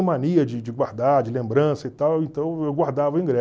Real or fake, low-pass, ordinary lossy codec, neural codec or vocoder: real; none; none; none